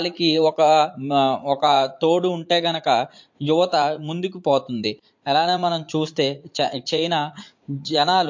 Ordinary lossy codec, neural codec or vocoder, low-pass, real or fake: MP3, 48 kbps; vocoder, 44.1 kHz, 80 mel bands, Vocos; 7.2 kHz; fake